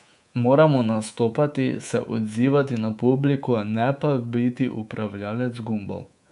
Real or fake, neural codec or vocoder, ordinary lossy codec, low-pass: fake; codec, 24 kHz, 3.1 kbps, DualCodec; none; 10.8 kHz